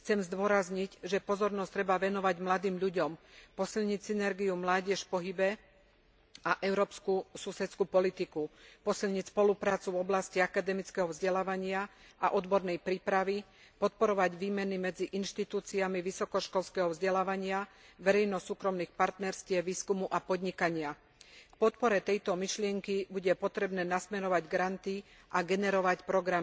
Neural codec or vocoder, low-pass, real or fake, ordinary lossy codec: none; none; real; none